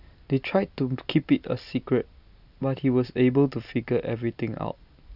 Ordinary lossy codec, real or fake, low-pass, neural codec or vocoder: none; real; 5.4 kHz; none